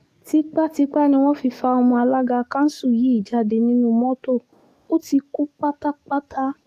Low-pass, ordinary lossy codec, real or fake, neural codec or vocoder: 14.4 kHz; AAC, 64 kbps; fake; codec, 44.1 kHz, 7.8 kbps, DAC